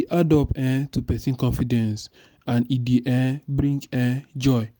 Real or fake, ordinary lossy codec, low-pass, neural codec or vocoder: real; none; none; none